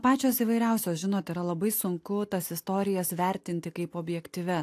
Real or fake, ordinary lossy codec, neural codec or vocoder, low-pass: real; AAC, 64 kbps; none; 14.4 kHz